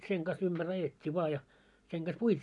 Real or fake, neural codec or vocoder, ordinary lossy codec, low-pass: real; none; none; 10.8 kHz